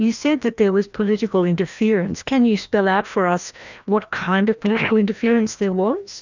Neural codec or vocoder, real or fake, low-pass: codec, 16 kHz, 1 kbps, FreqCodec, larger model; fake; 7.2 kHz